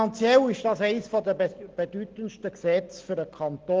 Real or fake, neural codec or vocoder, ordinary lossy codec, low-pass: real; none; Opus, 16 kbps; 7.2 kHz